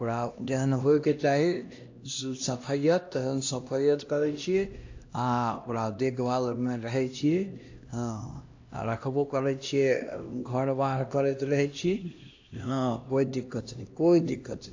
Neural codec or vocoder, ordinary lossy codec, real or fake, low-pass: codec, 16 kHz, 1 kbps, X-Codec, HuBERT features, trained on LibriSpeech; AAC, 48 kbps; fake; 7.2 kHz